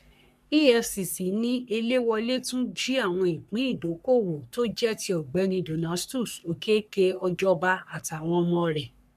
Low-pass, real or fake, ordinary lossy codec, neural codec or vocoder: 14.4 kHz; fake; none; codec, 44.1 kHz, 3.4 kbps, Pupu-Codec